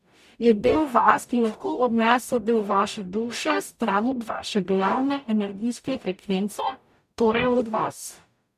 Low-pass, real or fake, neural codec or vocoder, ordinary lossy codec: 14.4 kHz; fake; codec, 44.1 kHz, 0.9 kbps, DAC; none